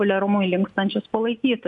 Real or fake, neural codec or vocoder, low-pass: real; none; 10.8 kHz